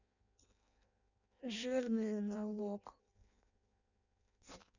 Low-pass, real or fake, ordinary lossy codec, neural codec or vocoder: 7.2 kHz; fake; none; codec, 16 kHz in and 24 kHz out, 0.6 kbps, FireRedTTS-2 codec